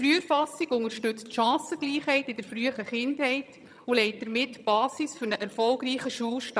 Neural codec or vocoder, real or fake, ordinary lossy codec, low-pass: vocoder, 22.05 kHz, 80 mel bands, HiFi-GAN; fake; none; none